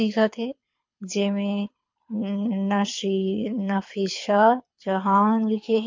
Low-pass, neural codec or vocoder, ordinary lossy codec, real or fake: 7.2 kHz; codec, 24 kHz, 6 kbps, HILCodec; MP3, 48 kbps; fake